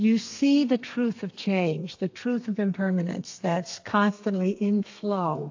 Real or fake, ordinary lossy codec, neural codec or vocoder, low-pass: fake; AAC, 48 kbps; codec, 32 kHz, 1.9 kbps, SNAC; 7.2 kHz